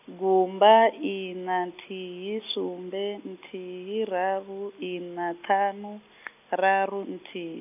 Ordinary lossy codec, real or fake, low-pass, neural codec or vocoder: none; real; 3.6 kHz; none